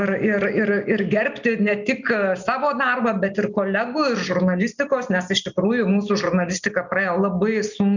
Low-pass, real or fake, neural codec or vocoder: 7.2 kHz; real; none